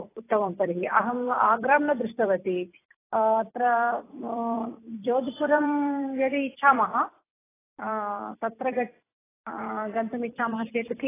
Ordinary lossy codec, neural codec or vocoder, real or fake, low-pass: AAC, 16 kbps; vocoder, 44.1 kHz, 128 mel bands every 256 samples, BigVGAN v2; fake; 3.6 kHz